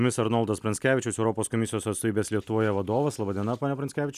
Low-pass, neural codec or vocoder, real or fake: 14.4 kHz; none; real